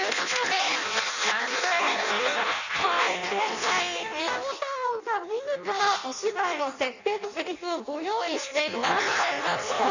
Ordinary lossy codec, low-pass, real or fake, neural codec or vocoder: none; 7.2 kHz; fake; codec, 16 kHz in and 24 kHz out, 0.6 kbps, FireRedTTS-2 codec